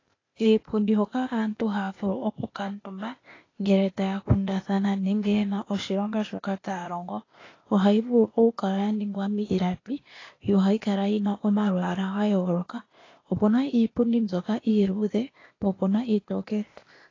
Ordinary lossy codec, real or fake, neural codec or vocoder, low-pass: AAC, 32 kbps; fake; codec, 16 kHz, 0.8 kbps, ZipCodec; 7.2 kHz